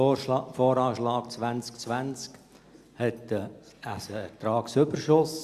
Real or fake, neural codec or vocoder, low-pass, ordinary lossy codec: real; none; 14.4 kHz; Opus, 64 kbps